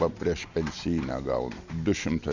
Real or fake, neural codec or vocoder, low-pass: real; none; 7.2 kHz